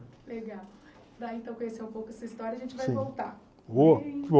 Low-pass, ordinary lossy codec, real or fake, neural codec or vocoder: none; none; real; none